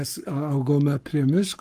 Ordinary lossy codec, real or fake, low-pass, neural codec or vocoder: Opus, 32 kbps; fake; 14.4 kHz; codec, 44.1 kHz, 7.8 kbps, Pupu-Codec